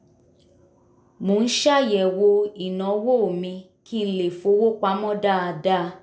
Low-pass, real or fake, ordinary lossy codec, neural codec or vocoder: none; real; none; none